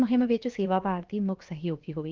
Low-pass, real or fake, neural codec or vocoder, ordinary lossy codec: 7.2 kHz; fake; codec, 16 kHz, about 1 kbps, DyCAST, with the encoder's durations; Opus, 32 kbps